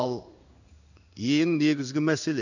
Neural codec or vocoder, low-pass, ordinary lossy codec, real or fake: codec, 16 kHz in and 24 kHz out, 1 kbps, XY-Tokenizer; 7.2 kHz; none; fake